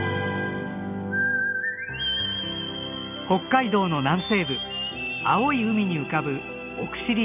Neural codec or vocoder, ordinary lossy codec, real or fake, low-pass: none; none; real; 3.6 kHz